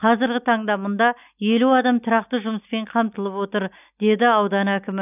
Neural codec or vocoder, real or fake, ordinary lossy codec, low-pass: none; real; none; 3.6 kHz